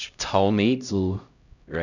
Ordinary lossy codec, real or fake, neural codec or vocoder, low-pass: none; fake; codec, 16 kHz, 0.5 kbps, X-Codec, HuBERT features, trained on LibriSpeech; 7.2 kHz